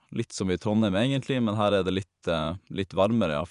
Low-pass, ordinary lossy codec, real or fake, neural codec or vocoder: 10.8 kHz; none; fake; vocoder, 24 kHz, 100 mel bands, Vocos